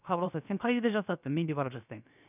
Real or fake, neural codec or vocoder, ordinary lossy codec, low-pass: fake; codec, 16 kHz, 0.7 kbps, FocalCodec; none; 3.6 kHz